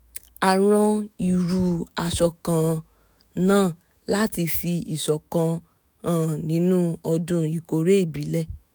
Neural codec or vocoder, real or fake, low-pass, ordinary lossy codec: autoencoder, 48 kHz, 128 numbers a frame, DAC-VAE, trained on Japanese speech; fake; none; none